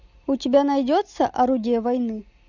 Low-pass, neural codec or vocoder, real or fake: 7.2 kHz; none; real